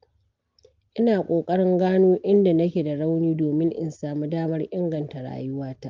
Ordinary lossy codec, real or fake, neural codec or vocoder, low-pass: Opus, 32 kbps; real; none; 7.2 kHz